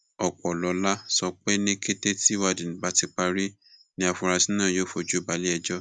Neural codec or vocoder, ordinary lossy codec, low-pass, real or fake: none; none; 9.9 kHz; real